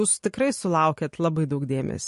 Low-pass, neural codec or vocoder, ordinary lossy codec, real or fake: 14.4 kHz; none; MP3, 48 kbps; real